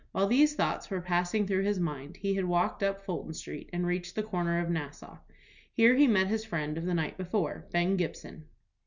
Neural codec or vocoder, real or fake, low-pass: none; real; 7.2 kHz